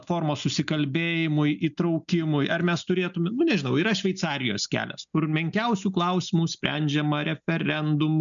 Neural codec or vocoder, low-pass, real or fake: none; 7.2 kHz; real